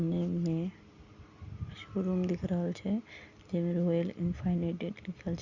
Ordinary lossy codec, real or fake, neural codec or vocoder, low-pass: Opus, 64 kbps; real; none; 7.2 kHz